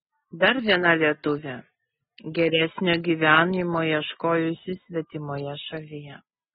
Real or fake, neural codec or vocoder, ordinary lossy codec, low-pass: real; none; AAC, 16 kbps; 19.8 kHz